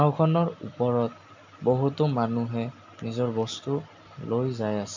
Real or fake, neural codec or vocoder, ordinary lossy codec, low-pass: real; none; MP3, 64 kbps; 7.2 kHz